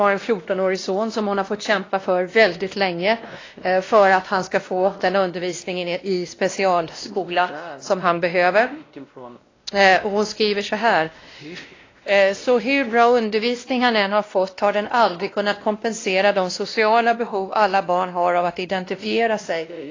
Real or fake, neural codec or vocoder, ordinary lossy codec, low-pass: fake; codec, 16 kHz, 1 kbps, X-Codec, WavLM features, trained on Multilingual LibriSpeech; AAC, 32 kbps; 7.2 kHz